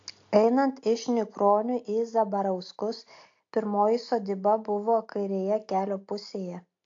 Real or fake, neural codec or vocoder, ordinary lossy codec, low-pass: real; none; AAC, 48 kbps; 7.2 kHz